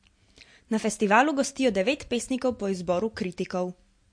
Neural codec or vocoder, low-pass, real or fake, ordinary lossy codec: none; 9.9 kHz; real; MP3, 48 kbps